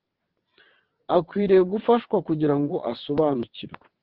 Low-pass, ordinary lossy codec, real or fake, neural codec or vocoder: 5.4 kHz; Opus, 16 kbps; fake; vocoder, 22.05 kHz, 80 mel bands, WaveNeXt